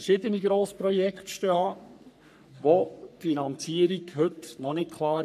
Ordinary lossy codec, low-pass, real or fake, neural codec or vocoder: none; 14.4 kHz; fake; codec, 44.1 kHz, 3.4 kbps, Pupu-Codec